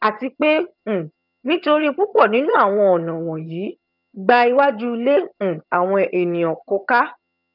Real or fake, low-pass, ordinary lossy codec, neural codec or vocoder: fake; 5.4 kHz; none; vocoder, 22.05 kHz, 80 mel bands, HiFi-GAN